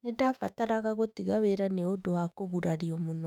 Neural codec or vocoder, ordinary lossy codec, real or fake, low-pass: autoencoder, 48 kHz, 32 numbers a frame, DAC-VAE, trained on Japanese speech; none; fake; 19.8 kHz